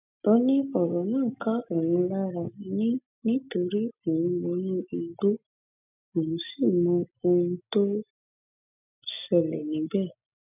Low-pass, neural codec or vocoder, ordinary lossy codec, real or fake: 3.6 kHz; none; none; real